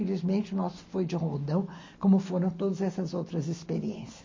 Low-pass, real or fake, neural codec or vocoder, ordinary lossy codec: 7.2 kHz; real; none; MP3, 32 kbps